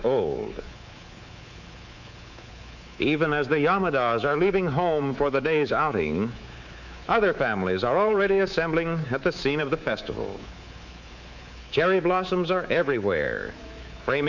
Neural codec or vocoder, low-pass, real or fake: codec, 24 kHz, 3.1 kbps, DualCodec; 7.2 kHz; fake